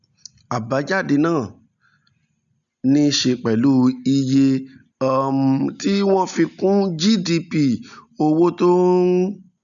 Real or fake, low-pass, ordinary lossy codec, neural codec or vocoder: real; 7.2 kHz; none; none